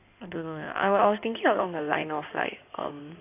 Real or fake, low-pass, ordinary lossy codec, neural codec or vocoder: fake; 3.6 kHz; none; codec, 16 kHz in and 24 kHz out, 2.2 kbps, FireRedTTS-2 codec